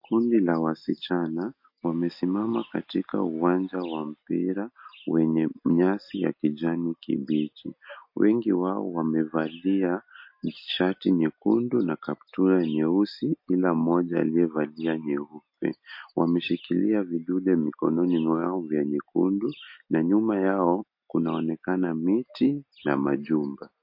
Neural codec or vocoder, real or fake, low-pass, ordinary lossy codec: none; real; 5.4 kHz; MP3, 32 kbps